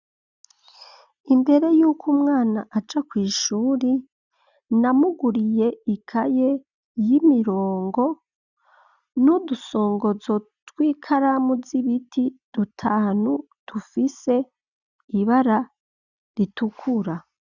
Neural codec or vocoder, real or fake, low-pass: none; real; 7.2 kHz